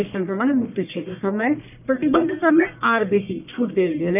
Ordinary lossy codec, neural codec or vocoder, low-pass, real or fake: none; codec, 44.1 kHz, 1.7 kbps, Pupu-Codec; 3.6 kHz; fake